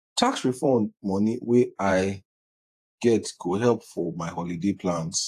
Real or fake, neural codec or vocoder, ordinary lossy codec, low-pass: fake; vocoder, 48 kHz, 128 mel bands, Vocos; AAC, 64 kbps; 14.4 kHz